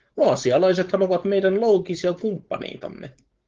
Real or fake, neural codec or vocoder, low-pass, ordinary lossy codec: fake; codec, 16 kHz, 4.8 kbps, FACodec; 7.2 kHz; Opus, 16 kbps